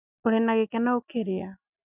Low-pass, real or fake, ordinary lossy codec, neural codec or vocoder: 3.6 kHz; real; none; none